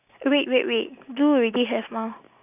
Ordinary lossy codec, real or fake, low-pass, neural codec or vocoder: none; real; 3.6 kHz; none